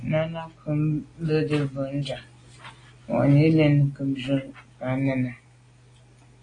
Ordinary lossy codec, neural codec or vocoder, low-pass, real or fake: AAC, 32 kbps; none; 9.9 kHz; real